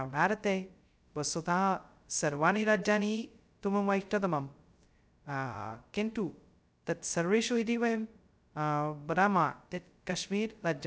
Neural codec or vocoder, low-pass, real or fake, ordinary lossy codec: codec, 16 kHz, 0.2 kbps, FocalCodec; none; fake; none